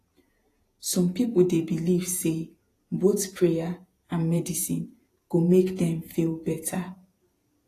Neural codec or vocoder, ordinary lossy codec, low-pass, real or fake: vocoder, 48 kHz, 128 mel bands, Vocos; AAC, 48 kbps; 14.4 kHz; fake